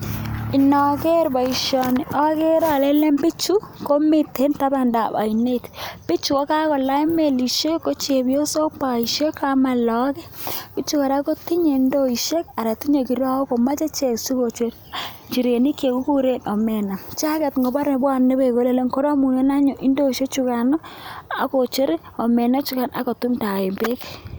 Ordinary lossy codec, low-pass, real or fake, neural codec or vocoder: none; none; real; none